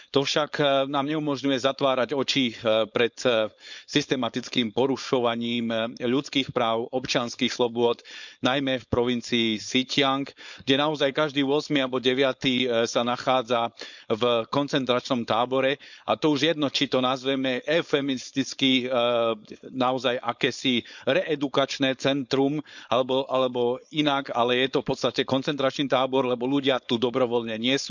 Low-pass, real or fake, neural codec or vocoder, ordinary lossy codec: 7.2 kHz; fake; codec, 16 kHz, 4.8 kbps, FACodec; none